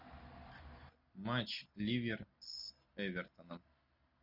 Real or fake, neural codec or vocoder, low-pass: real; none; 5.4 kHz